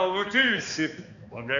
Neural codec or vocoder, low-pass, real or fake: codec, 16 kHz, 2 kbps, X-Codec, HuBERT features, trained on balanced general audio; 7.2 kHz; fake